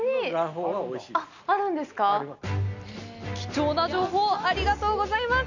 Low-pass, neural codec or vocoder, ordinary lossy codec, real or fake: 7.2 kHz; none; none; real